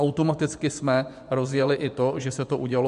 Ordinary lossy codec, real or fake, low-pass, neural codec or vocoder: MP3, 64 kbps; fake; 10.8 kHz; vocoder, 24 kHz, 100 mel bands, Vocos